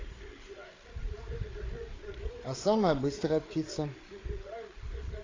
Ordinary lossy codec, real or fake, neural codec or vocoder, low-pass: AAC, 32 kbps; fake; codec, 16 kHz, 8 kbps, FreqCodec, larger model; 7.2 kHz